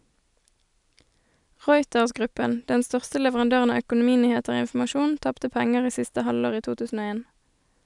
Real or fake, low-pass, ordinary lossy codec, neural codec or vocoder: real; 10.8 kHz; none; none